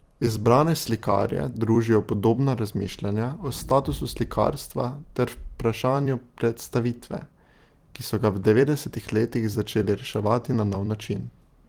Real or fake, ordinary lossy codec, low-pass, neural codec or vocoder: fake; Opus, 24 kbps; 19.8 kHz; vocoder, 44.1 kHz, 128 mel bands every 256 samples, BigVGAN v2